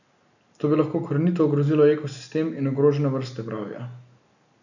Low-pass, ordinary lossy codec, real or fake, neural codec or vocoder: 7.2 kHz; none; real; none